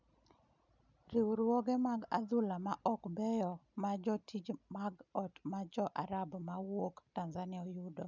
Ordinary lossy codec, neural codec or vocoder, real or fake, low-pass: none; none; real; 7.2 kHz